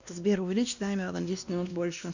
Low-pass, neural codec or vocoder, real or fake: 7.2 kHz; codec, 16 kHz, 1 kbps, X-Codec, WavLM features, trained on Multilingual LibriSpeech; fake